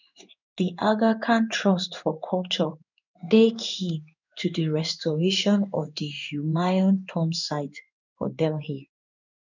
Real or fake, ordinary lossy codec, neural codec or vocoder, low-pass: fake; none; codec, 16 kHz in and 24 kHz out, 1 kbps, XY-Tokenizer; 7.2 kHz